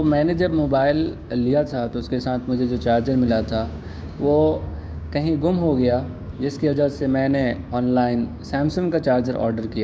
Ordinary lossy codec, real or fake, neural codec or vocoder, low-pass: none; fake; codec, 16 kHz, 6 kbps, DAC; none